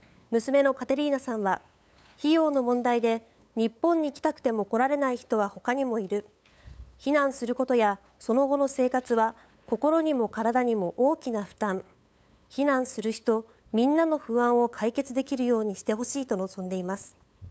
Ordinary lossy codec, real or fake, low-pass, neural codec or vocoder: none; fake; none; codec, 16 kHz, 8 kbps, FunCodec, trained on LibriTTS, 25 frames a second